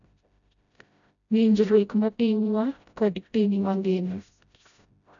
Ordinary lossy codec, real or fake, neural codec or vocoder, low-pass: none; fake; codec, 16 kHz, 0.5 kbps, FreqCodec, smaller model; 7.2 kHz